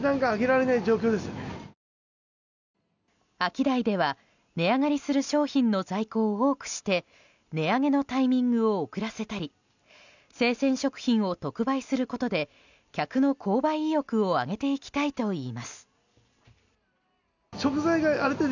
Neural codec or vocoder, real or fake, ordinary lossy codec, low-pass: none; real; none; 7.2 kHz